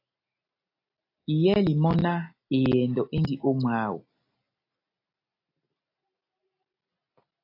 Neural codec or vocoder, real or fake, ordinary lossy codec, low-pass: none; real; AAC, 32 kbps; 5.4 kHz